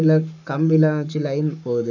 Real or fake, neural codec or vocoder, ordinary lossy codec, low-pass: fake; codec, 44.1 kHz, 7.8 kbps, Pupu-Codec; none; 7.2 kHz